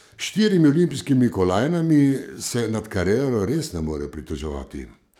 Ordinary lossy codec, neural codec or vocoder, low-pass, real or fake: none; codec, 44.1 kHz, 7.8 kbps, DAC; 19.8 kHz; fake